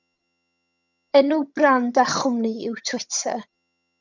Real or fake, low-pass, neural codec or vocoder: fake; 7.2 kHz; vocoder, 22.05 kHz, 80 mel bands, HiFi-GAN